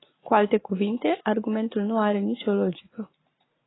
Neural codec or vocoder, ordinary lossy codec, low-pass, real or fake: none; AAC, 16 kbps; 7.2 kHz; real